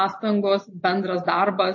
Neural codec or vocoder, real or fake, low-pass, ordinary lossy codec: none; real; 7.2 kHz; MP3, 32 kbps